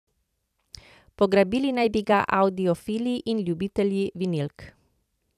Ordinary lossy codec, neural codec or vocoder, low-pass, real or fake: none; vocoder, 44.1 kHz, 128 mel bands every 256 samples, BigVGAN v2; 14.4 kHz; fake